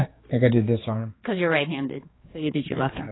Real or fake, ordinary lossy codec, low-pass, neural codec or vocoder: fake; AAC, 16 kbps; 7.2 kHz; codec, 16 kHz, 4 kbps, X-Codec, HuBERT features, trained on general audio